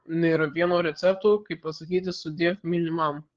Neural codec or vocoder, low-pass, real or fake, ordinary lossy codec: codec, 16 kHz, 8 kbps, FreqCodec, larger model; 7.2 kHz; fake; Opus, 16 kbps